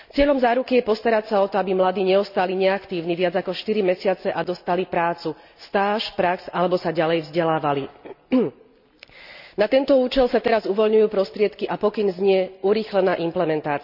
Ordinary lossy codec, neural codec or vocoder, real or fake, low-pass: none; none; real; 5.4 kHz